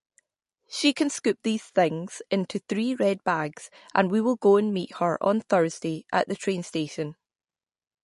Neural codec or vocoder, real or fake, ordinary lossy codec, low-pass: none; real; MP3, 48 kbps; 14.4 kHz